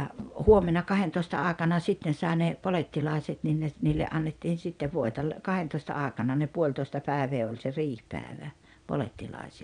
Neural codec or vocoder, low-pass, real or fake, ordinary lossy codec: vocoder, 22.05 kHz, 80 mel bands, Vocos; 9.9 kHz; fake; none